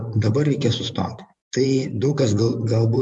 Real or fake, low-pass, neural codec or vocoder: fake; 10.8 kHz; vocoder, 24 kHz, 100 mel bands, Vocos